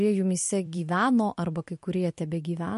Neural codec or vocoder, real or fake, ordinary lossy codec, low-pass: vocoder, 44.1 kHz, 128 mel bands every 256 samples, BigVGAN v2; fake; MP3, 48 kbps; 14.4 kHz